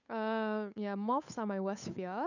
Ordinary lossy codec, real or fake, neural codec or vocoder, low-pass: none; fake; codec, 16 kHz, 8 kbps, FunCodec, trained on Chinese and English, 25 frames a second; 7.2 kHz